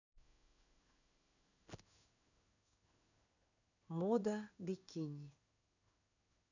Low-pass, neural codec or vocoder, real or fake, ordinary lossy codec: 7.2 kHz; codec, 16 kHz in and 24 kHz out, 1 kbps, XY-Tokenizer; fake; none